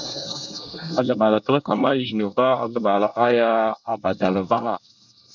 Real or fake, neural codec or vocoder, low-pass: fake; codec, 24 kHz, 1 kbps, SNAC; 7.2 kHz